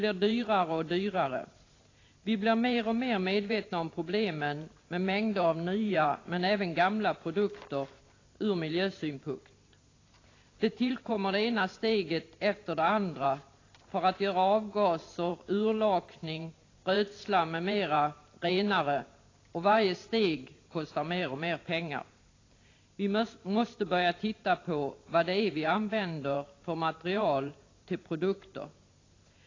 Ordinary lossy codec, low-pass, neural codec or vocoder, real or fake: AAC, 32 kbps; 7.2 kHz; vocoder, 44.1 kHz, 128 mel bands every 512 samples, BigVGAN v2; fake